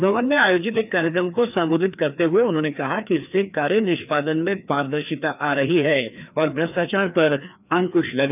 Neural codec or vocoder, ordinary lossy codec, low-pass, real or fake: codec, 16 kHz, 2 kbps, FreqCodec, larger model; none; 3.6 kHz; fake